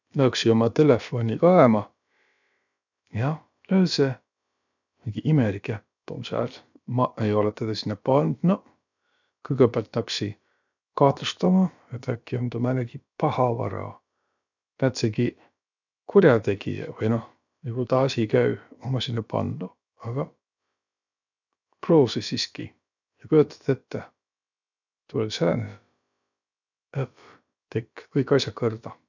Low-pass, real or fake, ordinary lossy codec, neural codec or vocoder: 7.2 kHz; fake; none; codec, 16 kHz, about 1 kbps, DyCAST, with the encoder's durations